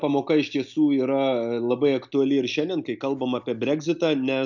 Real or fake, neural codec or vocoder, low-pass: real; none; 7.2 kHz